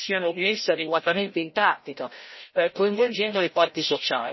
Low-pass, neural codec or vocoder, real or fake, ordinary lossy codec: 7.2 kHz; codec, 16 kHz, 0.5 kbps, FreqCodec, larger model; fake; MP3, 24 kbps